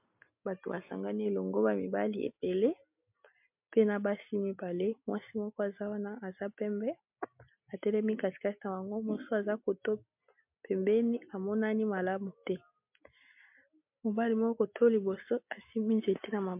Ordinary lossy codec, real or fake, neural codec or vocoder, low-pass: MP3, 32 kbps; real; none; 3.6 kHz